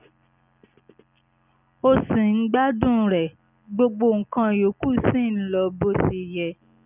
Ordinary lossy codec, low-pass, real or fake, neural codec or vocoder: none; 3.6 kHz; real; none